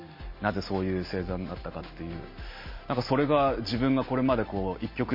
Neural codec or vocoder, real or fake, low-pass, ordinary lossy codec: none; real; 5.4 kHz; MP3, 24 kbps